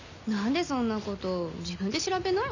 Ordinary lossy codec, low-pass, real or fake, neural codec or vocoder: none; 7.2 kHz; real; none